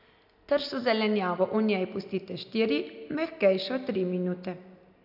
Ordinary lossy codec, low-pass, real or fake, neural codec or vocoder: AAC, 48 kbps; 5.4 kHz; real; none